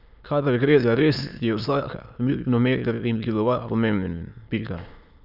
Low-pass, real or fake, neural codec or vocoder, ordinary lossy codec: 5.4 kHz; fake; autoencoder, 22.05 kHz, a latent of 192 numbers a frame, VITS, trained on many speakers; none